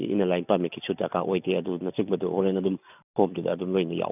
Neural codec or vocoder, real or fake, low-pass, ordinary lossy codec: none; real; 3.6 kHz; none